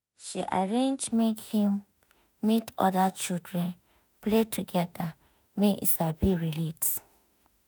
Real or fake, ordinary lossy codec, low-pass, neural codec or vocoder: fake; none; none; autoencoder, 48 kHz, 32 numbers a frame, DAC-VAE, trained on Japanese speech